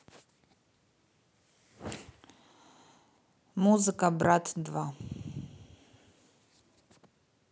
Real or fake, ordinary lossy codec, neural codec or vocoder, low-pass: real; none; none; none